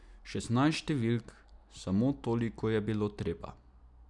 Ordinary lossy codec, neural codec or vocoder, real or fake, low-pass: none; none; real; 10.8 kHz